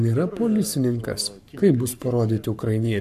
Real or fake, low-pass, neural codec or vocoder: fake; 14.4 kHz; codec, 44.1 kHz, 7.8 kbps, Pupu-Codec